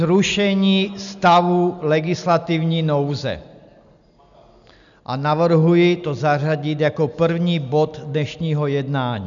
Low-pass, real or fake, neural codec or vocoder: 7.2 kHz; real; none